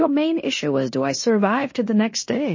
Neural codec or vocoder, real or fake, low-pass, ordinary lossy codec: codec, 16 kHz in and 24 kHz out, 0.9 kbps, LongCat-Audio-Codec, fine tuned four codebook decoder; fake; 7.2 kHz; MP3, 32 kbps